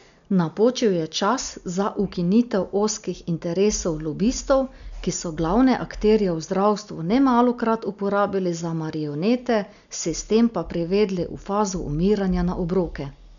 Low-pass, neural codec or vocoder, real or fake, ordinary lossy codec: 7.2 kHz; none; real; none